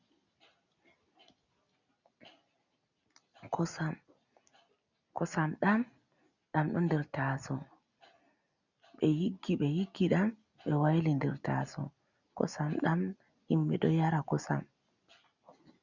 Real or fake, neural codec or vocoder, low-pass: real; none; 7.2 kHz